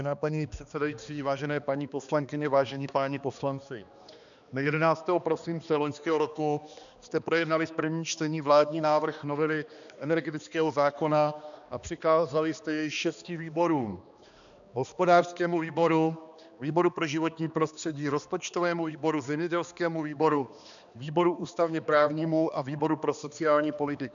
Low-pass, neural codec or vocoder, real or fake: 7.2 kHz; codec, 16 kHz, 2 kbps, X-Codec, HuBERT features, trained on balanced general audio; fake